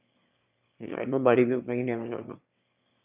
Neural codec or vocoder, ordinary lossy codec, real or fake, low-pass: autoencoder, 22.05 kHz, a latent of 192 numbers a frame, VITS, trained on one speaker; none; fake; 3.6 kHz